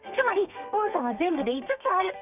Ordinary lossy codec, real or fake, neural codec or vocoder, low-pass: none; fake; codec, 32 kHz, 1.9 kbps, SNAC; 3.6 kHz